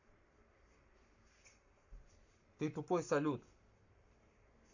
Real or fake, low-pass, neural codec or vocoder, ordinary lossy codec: fake; 7.2 kHz; codec, 44.1 kHz, 7.8 kbps, Pupu-Codec; none